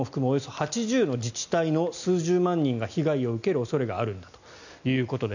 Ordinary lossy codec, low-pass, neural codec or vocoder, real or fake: none; 7.2 kHz; none; real